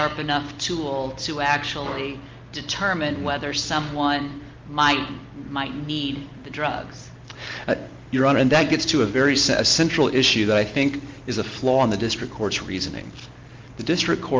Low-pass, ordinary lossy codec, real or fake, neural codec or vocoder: 7.2 kHz; Opus, 24 kbps; real; none